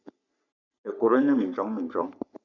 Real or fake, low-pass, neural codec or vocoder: fake; 7.2 kHz; codec, 44.1 kHz, 3.4 kbps, Pupu-Codec